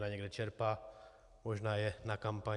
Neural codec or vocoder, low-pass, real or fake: none; 10.8 kHz; real